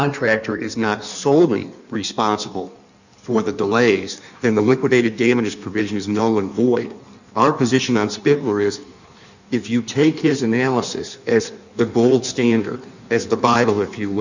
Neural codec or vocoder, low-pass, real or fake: codec, 16 kHz in and 24 kHz out, 1.1 kbps, FireRedTTS-2 codec; 7.2 kHz; fake